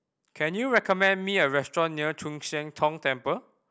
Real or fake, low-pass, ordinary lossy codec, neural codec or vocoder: real; none; none; none